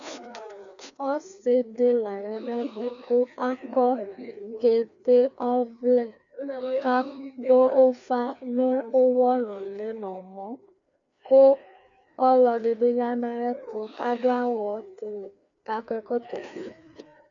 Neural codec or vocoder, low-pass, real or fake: codec, 16 kHz, 2 kbps, FreqCodec, larger model; 7.2 kHz; fake